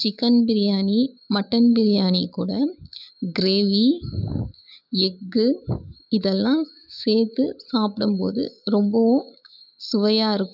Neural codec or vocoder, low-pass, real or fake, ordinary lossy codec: autoencoder, 48 kHz, 128 numbers a frame, DAC-VAE, trained on Japanese speech; 5.4 kHz; fake; none